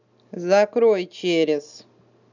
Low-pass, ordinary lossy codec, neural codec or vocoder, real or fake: 7.2 kHz; none; none; real